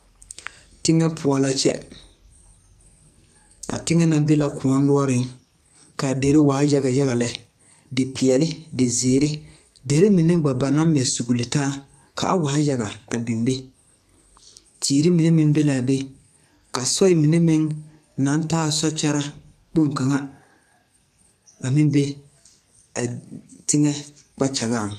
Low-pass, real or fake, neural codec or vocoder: 14.4 kHz; fake; codec, 44.1 kHz, 2.6 kbps, SNAC